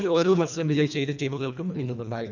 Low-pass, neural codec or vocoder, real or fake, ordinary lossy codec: 7.2 kHz; codec, 24 kHz, 1.5 kbps, HILCodec; fake; none